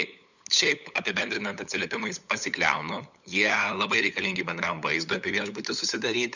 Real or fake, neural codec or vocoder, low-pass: fake; codec, 16 kHz, 8 kbps, FunCodec, trained on LibriTTS, 25 frames a second; 7.2 kHz